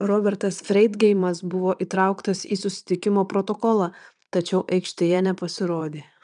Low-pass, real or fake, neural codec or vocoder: 9.9 kHz; fake; vocoder, 22.05 kHz, 80 mel bands, Vocos